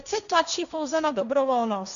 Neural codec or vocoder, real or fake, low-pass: codec, 16 kHz, 1.1 kbps, Voila-Tokenizer; fake; 7.2 kHz